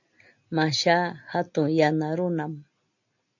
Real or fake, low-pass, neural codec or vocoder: real; 7.2 kHz; none